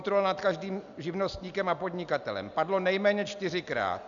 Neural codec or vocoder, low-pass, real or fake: none; 7.2 kHz; real